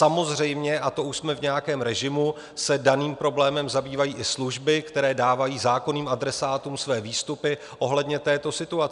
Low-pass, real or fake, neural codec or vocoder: 10.8 kHz; real; none